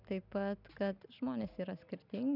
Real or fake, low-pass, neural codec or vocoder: real; 5.4 kHz; none